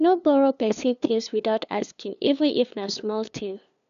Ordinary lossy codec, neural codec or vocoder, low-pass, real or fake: none; codec, 16 kHz, 2 kbps, FunCodec, trained on LibriTTS, 25 frames a second; 7.2 kHz; fake